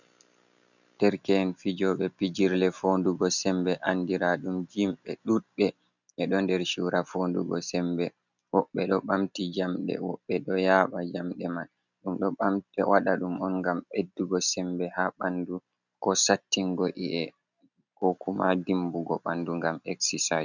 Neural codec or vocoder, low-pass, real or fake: none; 7.2 kHz; real